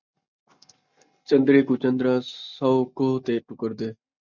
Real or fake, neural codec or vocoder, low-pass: real; none; 7.2 kHz